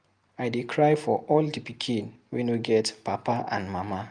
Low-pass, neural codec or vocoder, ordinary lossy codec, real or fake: 9.9 kHz; none; Opus, 32 kbps; real